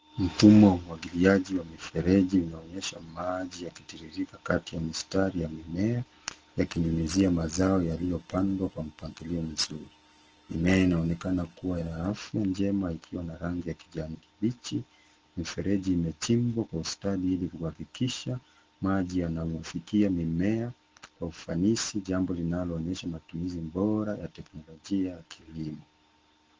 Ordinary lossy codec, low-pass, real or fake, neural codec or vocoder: Opus, 16 kbps; 7.2 kHz; real; none